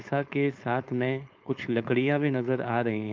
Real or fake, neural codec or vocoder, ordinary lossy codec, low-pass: fake; codec, 16 kHz, 4.8 kbps, FACodec; Opus, 32 kbps; 7.2 kHz